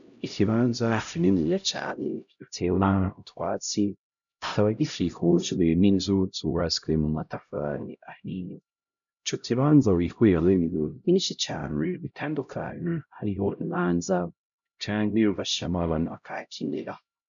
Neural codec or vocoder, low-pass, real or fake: codec, 16 kHz, 0.5 kbps, X-Codec, HuBERT features, trained on LibriSpeech; 7.2 kHz; fake